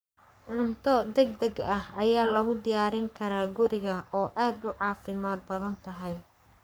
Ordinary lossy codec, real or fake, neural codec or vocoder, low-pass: none; fake; codec, 44.1 kHz, 3.4 kbps, Pupu-Codec; none